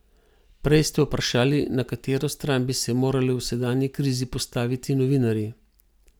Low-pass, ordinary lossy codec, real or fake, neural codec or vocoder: none; none; real; none